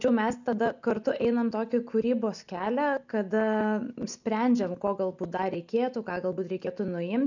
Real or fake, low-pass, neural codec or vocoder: real; 7.2 kHz; none